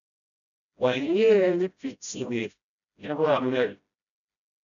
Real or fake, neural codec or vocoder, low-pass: fake; codec, 16 kHz, 0.5 kbps, FreqCodec, smaller model; 7.2 kHz